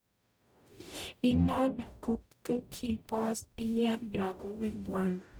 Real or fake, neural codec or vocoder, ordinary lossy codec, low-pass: fake; codec, 44.1 kHz, 0.9 kbps, DAC; none; none